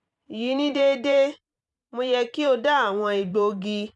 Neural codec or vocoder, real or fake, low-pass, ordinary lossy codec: vocoder, 44.1 kHz, 128 mel bands every 512 samples, BigVGAN v2; fake; 10.8 kHz; none